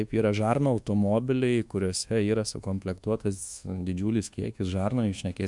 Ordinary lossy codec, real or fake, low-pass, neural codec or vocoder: MP3, 64 kbps; fake; 10.8 kHz; codec, 24 kHz, 1.2 kbps, DualCodec